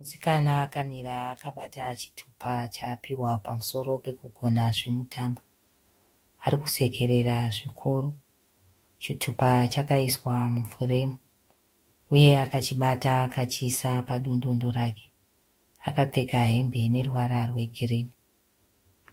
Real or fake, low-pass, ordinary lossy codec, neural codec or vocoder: fake; 19.8 kHz; AAC, 48 kbps; autoencoder, 48 kHz, 32 numbers a frame, DAC-VAE, trained on Japanese speech